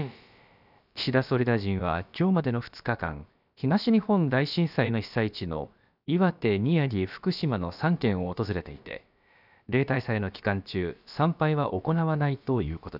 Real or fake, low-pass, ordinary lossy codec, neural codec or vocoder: fake; 5.4 kHz; none; codec, 16 kHz, about 1 kbps, DyCAST, with the encoder's durations